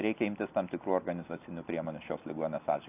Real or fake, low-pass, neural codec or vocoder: real; 3.6 kHz; none